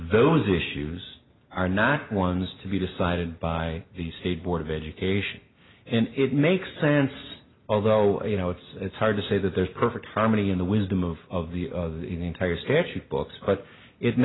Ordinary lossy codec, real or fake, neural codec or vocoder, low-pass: AAC, 16 kbps; real; none; 7.2 kHz